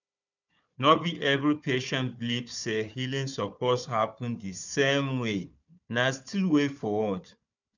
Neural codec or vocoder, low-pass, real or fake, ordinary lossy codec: codec, 16 kHz, 4 kbps, FunCodec, trained on Chinese and English, 50 frames a second; 7.2 kHz; fake; none